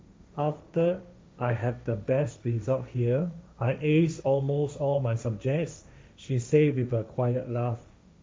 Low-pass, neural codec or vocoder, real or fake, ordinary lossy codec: none; codec, 16 kHz, 1.1 kbps, Voila-Tokenizer; fake; none